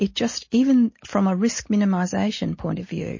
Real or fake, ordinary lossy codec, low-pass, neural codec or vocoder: real; MP3, 32 kbps; 7.2 kHz; none